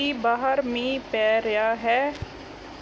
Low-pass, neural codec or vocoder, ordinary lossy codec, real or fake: none; none; none; real